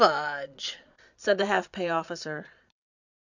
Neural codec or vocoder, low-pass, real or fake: vocoder, 22.05 kHz, 80 mel bands, Vocos; 7.2 kHz; fake